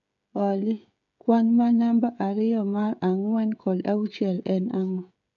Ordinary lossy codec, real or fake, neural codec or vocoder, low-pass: none; fake; codec, 16 kHz, 16 kbps, FreqCodec, smaller model; 7.2 kHz